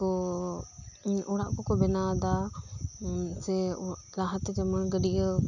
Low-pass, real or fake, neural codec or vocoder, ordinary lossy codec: 7.2 kHz; real; none; none